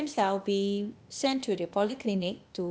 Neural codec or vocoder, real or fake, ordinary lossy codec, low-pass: codec, 16 kHz, 0.8 kbps, ZipCodec; fake; none; none